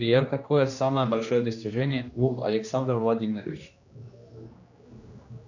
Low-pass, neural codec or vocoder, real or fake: 7.2 kHz; codec, 16 kHz, 1 kbps, X-Codec, HuBERT features, trained on general audio; fake